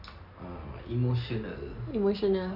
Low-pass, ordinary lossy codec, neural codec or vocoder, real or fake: 5.4 kHz; none; none; real